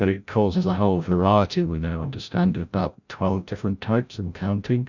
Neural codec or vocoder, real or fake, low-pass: codec, 16 kHz, 0.5 kbps, FreqCodec, larger model; fake; 7.2 kHz